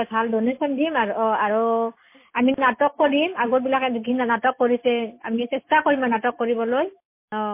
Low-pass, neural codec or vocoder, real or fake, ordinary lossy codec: 3.6 kHz; none; real; MP3, 24 kbps